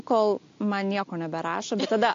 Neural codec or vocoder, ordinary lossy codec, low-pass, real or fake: none; AAC, 48 kbps; 7.2 kHz; real